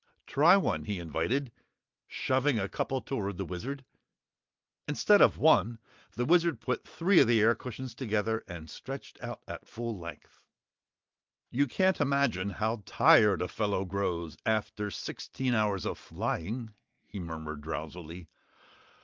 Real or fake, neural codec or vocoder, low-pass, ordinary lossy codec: real; none; 7.2 kHz; Opus, 24 kbps